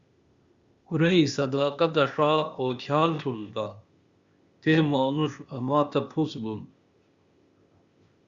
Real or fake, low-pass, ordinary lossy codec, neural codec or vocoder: fake; 7.2 kHz; Opus, 64 kbps; codec, 16 kHz, 0.8 kbps, ZipCodec